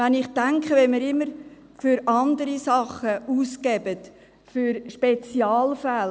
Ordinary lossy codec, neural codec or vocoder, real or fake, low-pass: none; none; real; none